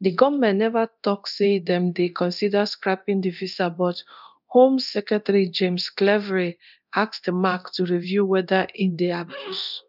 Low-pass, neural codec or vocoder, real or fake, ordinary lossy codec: 5.4 kHz; codec, 24 kHz, 0.9 kbps, DualCodec; fake; none